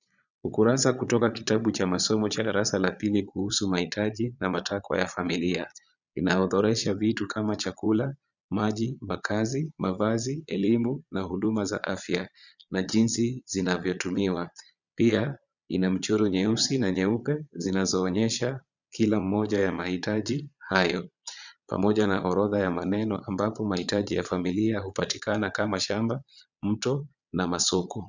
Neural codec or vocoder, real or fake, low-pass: vocoder, 22.05 kHz, 80 mel bands, Vocos; fake; 7.2 kHz